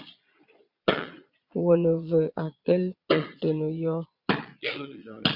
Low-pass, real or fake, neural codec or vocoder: 5.4 kHz; fake; vocoder, 22.05 kHz, 80 mel bands, Vocos